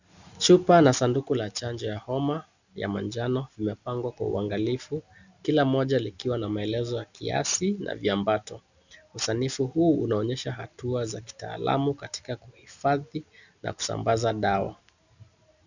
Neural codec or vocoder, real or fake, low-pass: none; real; 7.2 kHz